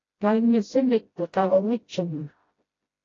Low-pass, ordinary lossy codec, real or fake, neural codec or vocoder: 7.2 kHz; AAC, 32 kbps; fake; codec, 16 kHz, 0.5 kbps, FreqCodec, smaller model